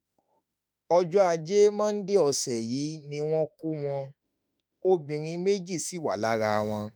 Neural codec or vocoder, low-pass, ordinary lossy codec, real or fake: autoencoder, 48 kHz, 32 numbers a frame, DAC-VAE, trained on Japanese speech; none; none; fake